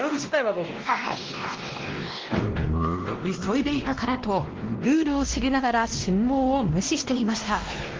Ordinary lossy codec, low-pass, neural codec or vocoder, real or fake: Opus, 16 kbps; 7.2 kHz; codec, 16 kHz, 1 kbps, X-Codec, WavLM features, trained on Multilingual LibriSpeech; fake